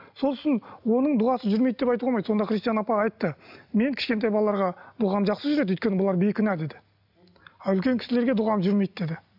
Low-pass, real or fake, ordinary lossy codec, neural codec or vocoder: 5.4 kHz; real; none; none